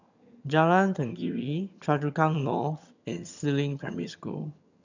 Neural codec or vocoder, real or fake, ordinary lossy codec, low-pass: vocoder, 22.05 kHz, 80 mel bands, HiFi-GAN; fake; none; 7.2 kHz